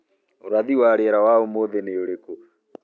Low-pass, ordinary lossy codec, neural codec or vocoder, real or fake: none; none; none; real